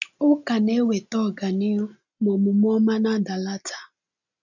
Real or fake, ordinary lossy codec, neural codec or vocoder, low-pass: real; none; none; 7.2 kHz